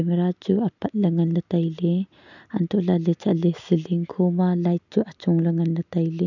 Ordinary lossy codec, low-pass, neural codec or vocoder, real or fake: none; 7.2 kHz; none; real